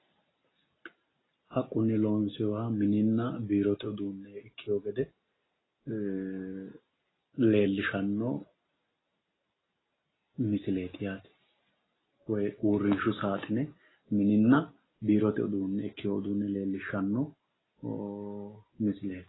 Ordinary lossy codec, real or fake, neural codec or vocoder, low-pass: AAC, 16 kbps; real; none; 7.2 kHz